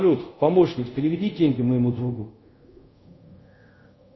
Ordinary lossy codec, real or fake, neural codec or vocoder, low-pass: MP3, 24 kbps; fake; codec, 24 kHz, 0.5 kbps, DualCodec; 7.2 kHz